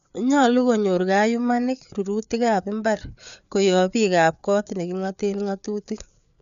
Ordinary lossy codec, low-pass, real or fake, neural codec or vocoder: none; 7.2 kHz; fake; codec, 16 kHz, 4 kbps, FreqCodec, larger model